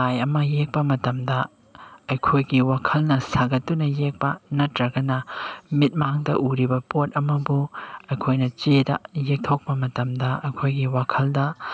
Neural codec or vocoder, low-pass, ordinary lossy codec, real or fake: none; none; none; real